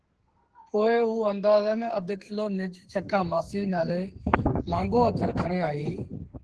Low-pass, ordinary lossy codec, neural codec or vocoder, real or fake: 10.8 kHz; Opus, 16 kbps; codec, 32 kHz, 1.9 kbps, SNAC; fake